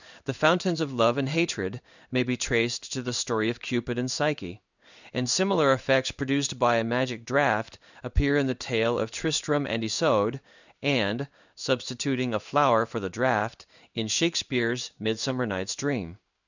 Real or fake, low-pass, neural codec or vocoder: fake; 7.2 kHz; codec, 16 kHz in and 24 kHz out, 1 kbps, XY-Tokenizer